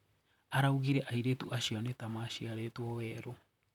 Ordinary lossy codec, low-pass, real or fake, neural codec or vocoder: none; 19.8 kHz; fake; vocoder, 44.1 kHz, 128 mel bands, Pupu-Vocoder